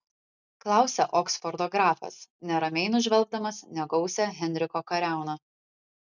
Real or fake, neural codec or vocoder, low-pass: real; none; 7.2 kHz